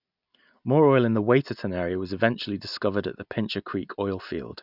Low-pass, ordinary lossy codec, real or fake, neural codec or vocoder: 5.4 kHz; none; real; none